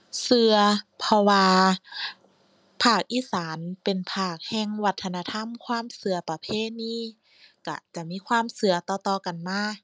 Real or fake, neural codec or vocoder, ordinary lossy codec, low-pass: real; none; none; none